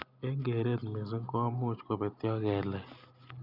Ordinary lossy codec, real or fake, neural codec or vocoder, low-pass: none; real; none; 5.4 kHz